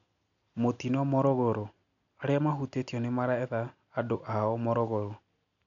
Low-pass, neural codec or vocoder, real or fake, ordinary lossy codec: 7.2 kHz; none; real; none